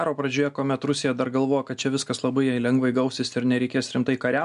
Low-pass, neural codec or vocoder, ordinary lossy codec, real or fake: 10.8 kHz; none; MP3, 96 kbps; real